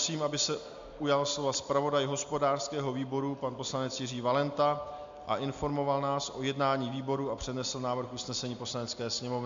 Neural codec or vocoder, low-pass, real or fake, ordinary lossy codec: none; 7.2 kHz; real; MP3, 64 kbps